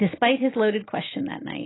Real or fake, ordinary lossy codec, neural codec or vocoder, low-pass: real; AAC, 16 kbps; none; 7.2 kHz